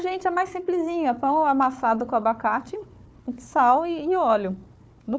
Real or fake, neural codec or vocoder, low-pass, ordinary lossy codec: fake; codec, 16 kHz, 4 kbps, FunCodec, trained on Chinese and English, 50 frames a second; none; none